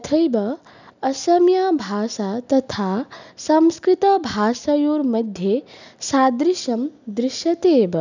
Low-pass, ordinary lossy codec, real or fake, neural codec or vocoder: 7.2 kHz; none; real; none